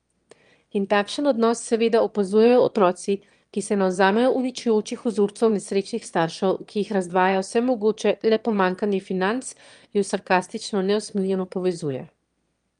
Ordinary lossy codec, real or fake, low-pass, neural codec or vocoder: Opus, 32 kbps; fake; 9.9 kHz; autoencoder, 22.05 kHz, a latent of 192 numbers a frame, VITS, trained on one speaker